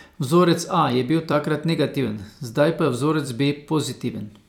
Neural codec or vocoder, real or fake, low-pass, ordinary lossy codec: vocoder, 44.1 kHz, 128 mel bands every 256 samples, BigVGAN v2; fake; 19.8 kHz; none